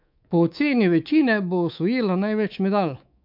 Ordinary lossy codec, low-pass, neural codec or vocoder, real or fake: MP3, 48 kbps; 5.4 kHz; codec, 24 kHz, 3.1 kbps, DualCodec; fake